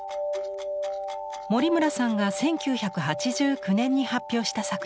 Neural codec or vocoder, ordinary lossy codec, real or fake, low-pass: none; none; real; none